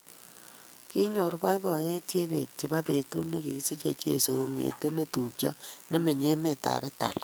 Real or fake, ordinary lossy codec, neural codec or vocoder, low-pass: fake; none; codec, 44.1 kHz, 2.6 kbps, SNAC; none